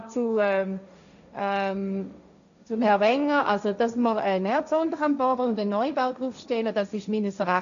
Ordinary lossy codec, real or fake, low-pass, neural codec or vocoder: none; fake; 7.2 kHz; codec, 16 kHz, 1.1 kbps, Voila-Tokenizer